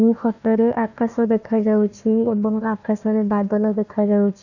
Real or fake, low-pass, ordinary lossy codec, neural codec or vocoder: fake; 7.2 kHz; none; codec, 16 kHz, 1 kbps, FunCodec, trained on Chinese and English, 50 frames a second